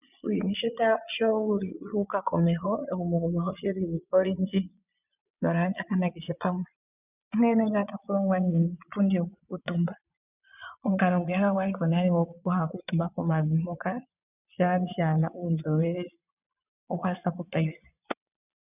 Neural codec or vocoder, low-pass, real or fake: vocoder, 22.05 kHz, 80 mel bands, Vocos; 3.6 kHz; fake